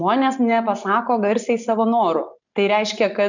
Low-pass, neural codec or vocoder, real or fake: 7.2 kHz; none; real